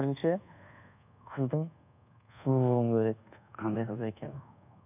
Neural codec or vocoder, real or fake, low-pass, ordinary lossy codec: codec, 24 kHz, 1.2 kbps, DualCodec; fake; 3.6 kHz; none